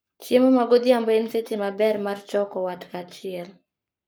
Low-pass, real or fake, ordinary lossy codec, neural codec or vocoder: none; fake; none; codec, 44.1 kHz, 7.8 kbps, Pupu-Codec